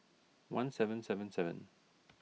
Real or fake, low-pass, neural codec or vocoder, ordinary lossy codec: real; none; none; none